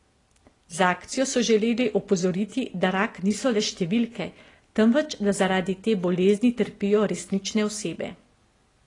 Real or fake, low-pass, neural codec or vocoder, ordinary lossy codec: real; 10.8 kHz; none; AAC, 32 kbps